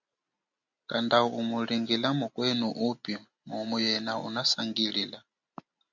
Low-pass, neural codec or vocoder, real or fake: 7.2 kHz; none; real